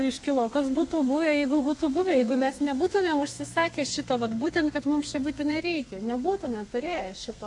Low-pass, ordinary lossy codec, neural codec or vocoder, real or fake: 10.8 kHz; AAC, 64 kbps; codec, 32 kHz, 1.9 kbps, SNAC; fake